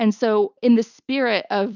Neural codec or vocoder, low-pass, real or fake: none; 7.2 kHz; real